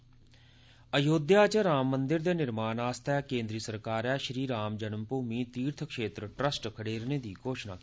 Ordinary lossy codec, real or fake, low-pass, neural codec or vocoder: none; real; none; none